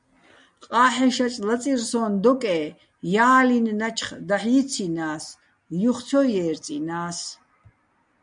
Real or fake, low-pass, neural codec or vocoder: real; 9.9 kHz; none